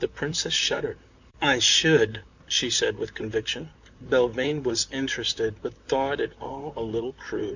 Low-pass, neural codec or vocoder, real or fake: 7.2 kHz; vocoder, 44.1 kHz, 128 mel bands, Pupu-Vocoder; fake